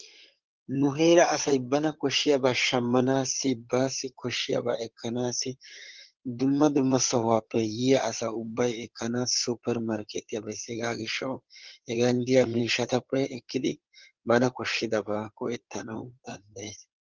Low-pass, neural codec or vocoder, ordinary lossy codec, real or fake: 7.2 kHz; codec, 16 kHz in and 24 kHz out, 2.2 kbps, FireRedTTS-2 codec; Opus, 16 kbps; fake